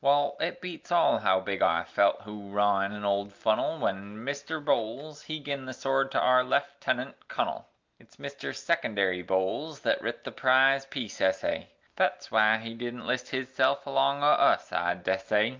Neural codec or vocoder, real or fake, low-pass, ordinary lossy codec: none; real; 7.2 kHz; Opus, 32 kbps